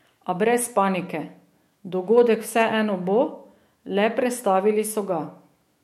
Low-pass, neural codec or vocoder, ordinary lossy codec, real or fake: 19.8 kHz; autoencoder, 48 kHz, 128 numbers a frame, DAC-VAE, trained on Japanese speech; MP3, 64 kbps; fake